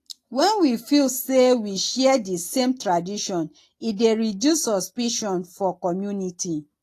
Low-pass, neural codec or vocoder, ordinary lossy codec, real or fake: 14.4 kHz; none; AAC, 48 kbps; real